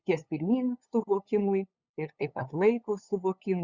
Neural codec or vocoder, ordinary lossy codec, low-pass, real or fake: codec, 16 kHz, 8 kbps, FunCodec, trained on LibriTTS, 25 frames a second; Opus, 64 kbps; 7.2 kHz; fake